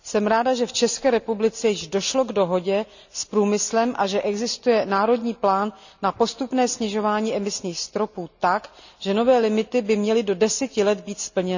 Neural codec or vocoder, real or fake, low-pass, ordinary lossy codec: none; real; 7.2 kHz; none